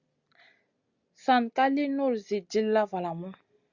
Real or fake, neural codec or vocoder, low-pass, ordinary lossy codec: real; none; 7.2 kHz; Opus, 64 kbps